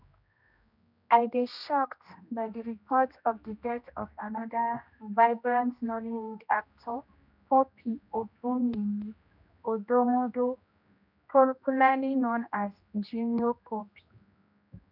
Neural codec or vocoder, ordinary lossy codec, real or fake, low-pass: codec, 16 kHz, 1 kbps, X-Codec, HuBERT features, trained on general audio; none; fake; 5.4 kHz